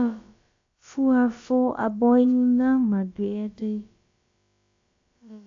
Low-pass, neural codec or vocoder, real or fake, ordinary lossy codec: 7.2 kHz; codec, 16 kHz, about 1 kbps, DyCAST, with the encoder's durations; fake; AAC, 48 kbps